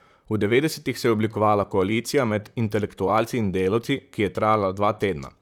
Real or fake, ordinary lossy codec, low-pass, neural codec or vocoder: fake; none; 19.8 kHz; vocoder, 44.1 kHz, 128 mel bands, Pupu-Vocoder